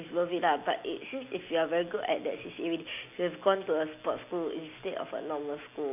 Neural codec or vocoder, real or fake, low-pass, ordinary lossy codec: none; real; 3.6 kHz; MP3, 32 kbps